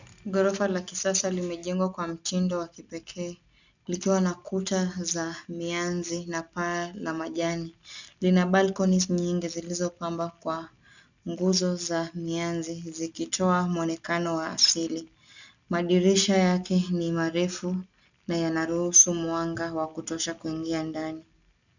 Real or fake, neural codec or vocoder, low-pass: real; none; 7.2 kHz